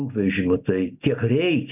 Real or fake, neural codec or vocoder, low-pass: real; none; 3.6 kHz